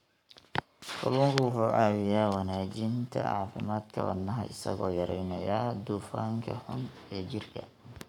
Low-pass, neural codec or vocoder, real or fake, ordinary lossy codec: 19.8 kHz; codec, 44.1 kHz, 7.8 kbps, Pupu-Codec; fake; none